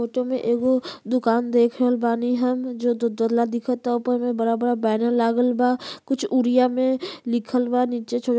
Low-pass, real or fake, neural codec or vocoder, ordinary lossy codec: none; real; none; none